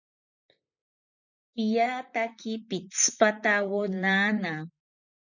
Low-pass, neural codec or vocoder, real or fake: 7.2 kHz; vocoder, 44.1 kHz, 128 mel bands, Pupu-Vocoder; fake